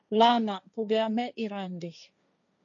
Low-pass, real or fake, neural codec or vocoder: 7.2 kHz; fake; codec, 16 kHz, 1.1 kbps, Voila-Tokenizer